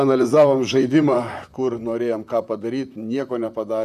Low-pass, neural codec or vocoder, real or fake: 14.4 kHz; vocoder, 44.1 kHz, 128 mel bands, Pupu-Vocoder; fake